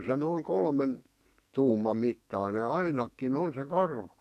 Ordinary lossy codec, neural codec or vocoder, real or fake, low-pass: none; codec, 44.1 kHz, 2.6 kbps, SNAC; fake; 14.4 kHz